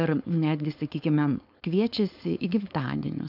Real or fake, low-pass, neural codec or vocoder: fake; 5.4 kHz; codec, 16 kHz, 4.8 kbps, FACodec